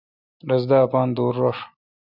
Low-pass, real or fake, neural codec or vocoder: 5.4 kHz; real; none